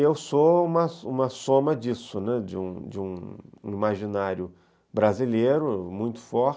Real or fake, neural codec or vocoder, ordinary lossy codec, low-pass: real; none; none; none